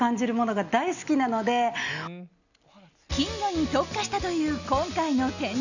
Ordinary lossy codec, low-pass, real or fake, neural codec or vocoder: none; 7.2 kHz; real; none